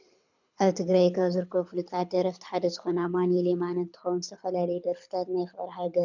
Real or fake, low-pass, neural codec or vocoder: fake; 7.2 kHz; codec, 24 kHz, 6 kbps, HILCodec